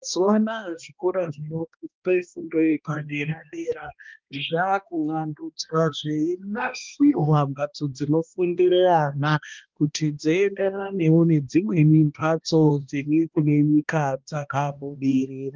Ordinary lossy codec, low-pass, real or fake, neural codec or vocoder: Opus, 32 kbps; 7.2 kHz; fake; codec, 16 kHz, 1 kbps, X-Codec, HuBERT features, trained on balanced general audio